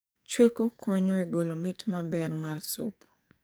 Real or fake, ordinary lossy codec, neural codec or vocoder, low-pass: fake; none; codec, 44.1 kHz, 2.6 kbps, SNAC; none